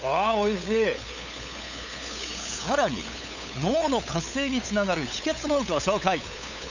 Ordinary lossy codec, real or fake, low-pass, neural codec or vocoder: none; fake; 7.2 kHz; codec, 16 kHz, 8 kbps, FunCodec, trained on LibriTTS, 25 frames a second